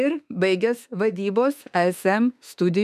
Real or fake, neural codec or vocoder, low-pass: fake; autoencoder, 48 kHz, 32 numbers a frame, DAC-VAE, trained on Japanese speech; 14.4 kHz